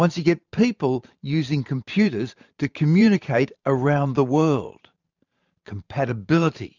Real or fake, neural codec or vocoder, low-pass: fake; vocoder, 44.1 kHz, 128 mel bands every 512 samples, BigVGAN v2; 7.2 kHz